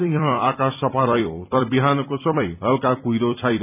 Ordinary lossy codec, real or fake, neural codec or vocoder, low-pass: none; fake; vocoder, 44.1 kHz, 128 mel bands every 256 samples, BigVGAN v2; 3.6 kHz